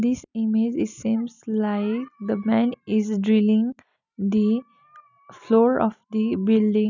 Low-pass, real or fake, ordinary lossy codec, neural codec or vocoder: 7.2 kHz; real; none; none